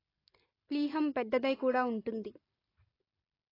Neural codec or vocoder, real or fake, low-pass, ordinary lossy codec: none; real; 5.4 kHz; AAC, 24 kbps